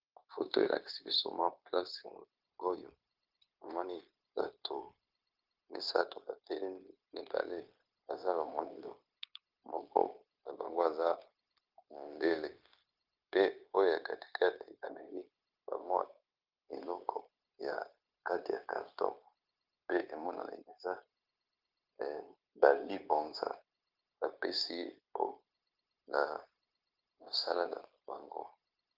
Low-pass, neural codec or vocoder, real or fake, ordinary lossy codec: 5.4 kHz; codec, 16 kHz, 0.9 kbps, LongCat-Audio-Codec; fake; Opus, 24 kbps